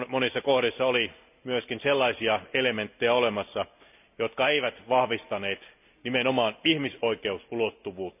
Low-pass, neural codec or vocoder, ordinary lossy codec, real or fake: 3.6 kHz; none; none; real